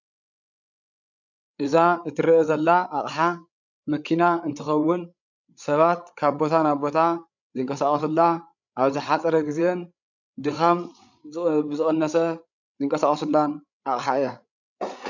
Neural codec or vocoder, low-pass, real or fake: codec, 16 kHz, 8 kbps, FreqCodec, larger model; 7.2 kHz; fake